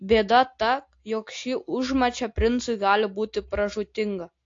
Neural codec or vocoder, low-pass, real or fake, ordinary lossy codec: none; 7.2 kHz; real; AAC, 48 kbps